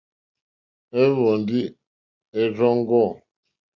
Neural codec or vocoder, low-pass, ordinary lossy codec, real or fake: none; 7.2 kHz; Opus, 64 kbps; real